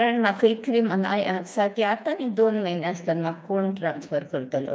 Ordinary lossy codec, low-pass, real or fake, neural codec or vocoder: none; none; fake; codec, 16 kHz, 2 kbps, FreqCodec, smaller model